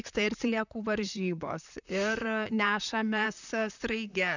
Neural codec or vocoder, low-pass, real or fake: vocoder, 44.1 kHz, 128 mel bands, Pupu-Vocoder; 7.2 kHz; fake